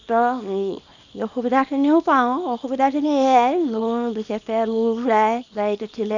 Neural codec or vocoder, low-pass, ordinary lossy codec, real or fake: codec, 24 kHz, 0.9 kbps, WavTokenizer, small release; 7.2 kHz; none; fake